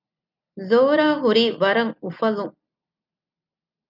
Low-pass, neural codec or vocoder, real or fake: 5.4 kHz; none; real